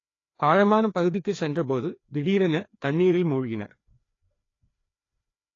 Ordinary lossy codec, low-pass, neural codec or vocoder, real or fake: AAC, 32 kbps; 7.2 kHz; codec, 16 kHz, 2 kbps, FreqCodec, larger model; fake